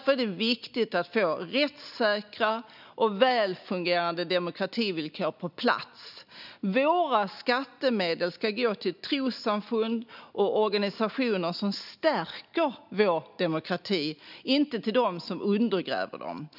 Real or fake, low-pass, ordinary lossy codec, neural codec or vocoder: real; 5.4 kHz; none; none